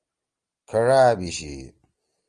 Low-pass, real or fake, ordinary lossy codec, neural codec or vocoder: 9.9 kHz; real; Opus, 24 kbps; none